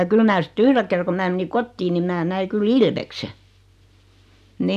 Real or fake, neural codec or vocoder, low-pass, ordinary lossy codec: real; none; 14.4 kHz; none